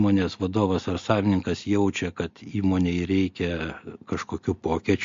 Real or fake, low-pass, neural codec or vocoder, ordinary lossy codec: real; 7.2 kHz; none; MP3, 48 kbps